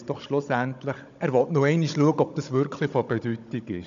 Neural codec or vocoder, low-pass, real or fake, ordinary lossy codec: codec, 16 kHz, 16 kbps, FunCodec, trained on Chinese and English, 50 frames a second; 7.2 kHz; fake; AAC, 96 kbps